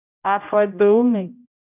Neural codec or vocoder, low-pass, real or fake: codec, 16 kHz, 0.5 kbps, X-Codec, HuBERT features, trained on general audio; 3.6 kHz; fake